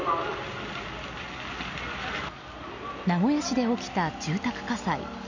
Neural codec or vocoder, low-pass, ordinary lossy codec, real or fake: none; 7.2 kHz; none; real